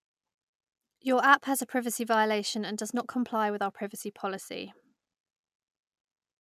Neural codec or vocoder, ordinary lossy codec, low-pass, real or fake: none; MP3, 96 kbps; 14.4 kHz; real